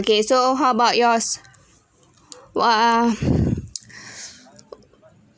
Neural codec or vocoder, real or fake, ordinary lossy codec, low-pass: none; real; none; none